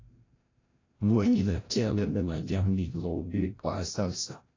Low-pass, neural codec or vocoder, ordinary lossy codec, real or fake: 7.2 kHz; codec, 16 kHz, 0.5 kbps, FreqCodec, larger model; AAC, 32 kbps; fake